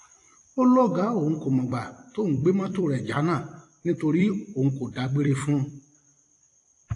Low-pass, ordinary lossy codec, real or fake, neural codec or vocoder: 10.8 kHz; AAC, 48 kbps; fake; vocoder, 44.1 kHz, 128 mel bands every 256 samples, BigVGAN v2